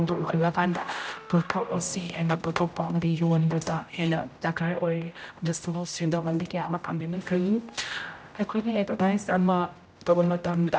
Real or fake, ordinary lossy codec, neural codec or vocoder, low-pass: fake; none; codec, 16 kHz, 0.5 kbps, X-Codec, HuBERT features, trained on general audio; none